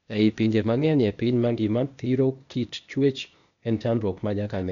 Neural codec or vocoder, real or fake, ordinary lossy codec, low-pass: codec, 16 kHz, 0.8 kbps, ZipCodec; fake; none; 7.2 kHz